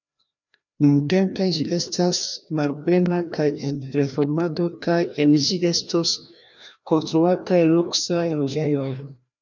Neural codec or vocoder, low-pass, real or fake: codec, 16 kHz, 1 kbps, FreqCodec, larger model; 7.2 kHz; fake